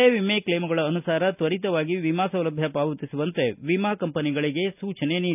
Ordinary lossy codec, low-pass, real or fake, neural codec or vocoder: none; 3.6 kHz; real; none